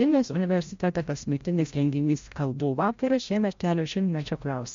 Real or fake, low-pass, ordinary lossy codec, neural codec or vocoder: fake; 7.2 kHz; MP3, 48 kbps; codec, 16 kHz, 0.5 kbps, FreqCodec, larger model